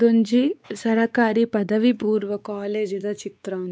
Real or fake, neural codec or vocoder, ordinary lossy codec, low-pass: fake; codec, 16 kHz, 4 kbps, X-Codec, WavLM features, trained on Multilingual LibriSpeech; none; none